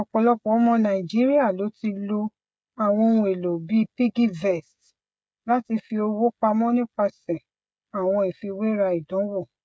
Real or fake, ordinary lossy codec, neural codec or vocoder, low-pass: fake; none; codec, 16 kHz, 16 kbps, FreqCodec, smaller model; none